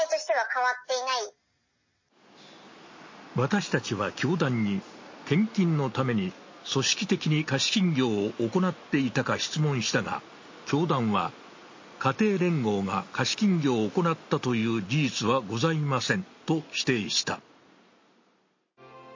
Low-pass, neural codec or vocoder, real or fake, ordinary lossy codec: 7.2 kHz; none; real; MP3, 32 kbps